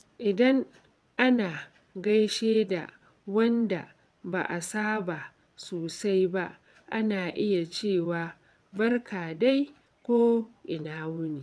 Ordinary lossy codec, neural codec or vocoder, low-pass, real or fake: none; vocoder, 22.05 kHz, 80 mel bands, WaveNeXt; none; fake